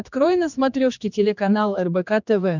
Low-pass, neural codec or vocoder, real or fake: 7.2 kHz; codec, 16 kHz, 2 kbps, X-Codec, HuBERT features, trained on general audio; fake